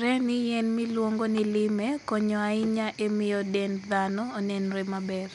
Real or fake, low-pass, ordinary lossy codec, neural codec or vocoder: real; 10.8 kHz; none; none